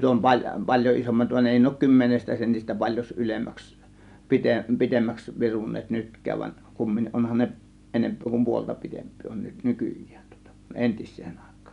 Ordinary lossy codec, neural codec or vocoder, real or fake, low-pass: none; none; real; 10.8 kHz